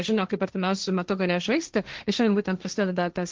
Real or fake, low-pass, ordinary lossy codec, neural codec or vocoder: fake; 7.2 kHz; Opus, 16 kbps; codec, 16 kHz, 1.1 kbps, Voila-Tokenizer